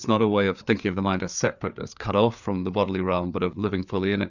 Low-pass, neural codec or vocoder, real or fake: 7.2 kHz; codec, 16 kHz, 4 kbps, FreqCodec, larger model; fake